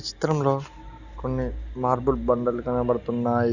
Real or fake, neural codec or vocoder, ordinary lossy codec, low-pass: real; none; none; 7.2 kHz